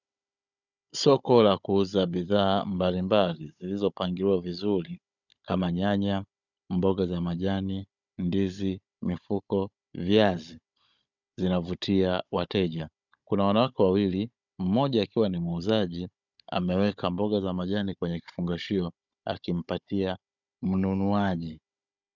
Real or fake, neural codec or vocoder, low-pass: fake; codec, 16 kHz, 16 kbps, FunCodec, trained on Chinese and English, 50 frames a second; 7.2 kHz